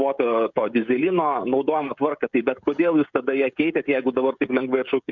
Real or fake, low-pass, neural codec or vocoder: fake; 7.2 kHz; codec, 16 kHz, 16 kbps, FreqCodec, smaller model